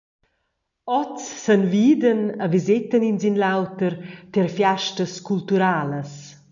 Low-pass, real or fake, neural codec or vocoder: 7.2 kHz; real; none